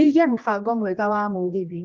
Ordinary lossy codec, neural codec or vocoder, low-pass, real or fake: Opus, 32 kbps; codec, 16 kHz, 1 kbps, X-Codec, HuBERT features, trained on general audio; 7.2 kHz; fake